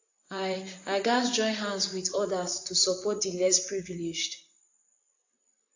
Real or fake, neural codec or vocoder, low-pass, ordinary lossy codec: fake; vocoder, 44.1 kHz, 128 mel bands, Pupu-Vocoder; 7.2 kHz; AAC, 48 kbps